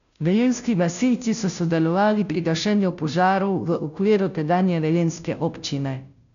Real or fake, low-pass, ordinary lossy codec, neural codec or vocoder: fake; 7.2 kHz; none; codec, 16 kHz, 0.5 kbps, FunCodec, trained on Chinese and English, 25 frames a second